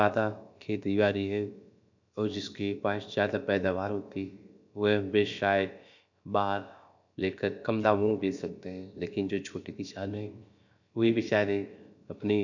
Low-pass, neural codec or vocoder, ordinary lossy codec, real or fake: 7.2 kHz; codec, 16 kHz, about 1 kbps, DyCAST, with the encoder's durations; none; fake